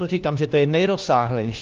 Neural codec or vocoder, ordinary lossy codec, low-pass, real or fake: codec, 16 kHz, 1 kbps, FunCodec, trained on LibriTTS, 50 frames a second; Opus, 32 kbps; 7.2 kHz; fake